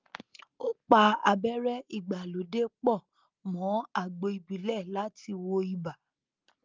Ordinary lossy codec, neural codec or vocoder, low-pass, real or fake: Opus, 24 kbps; none; 7.2 kHz; real